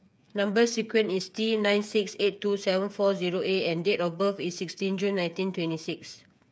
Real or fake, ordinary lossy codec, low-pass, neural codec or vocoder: fake; none; none; codec, 16 kHz, 8 kbps, FreqCodec, smaller model